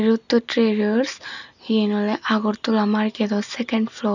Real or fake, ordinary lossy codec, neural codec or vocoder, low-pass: real; none; none; 7.2 kHz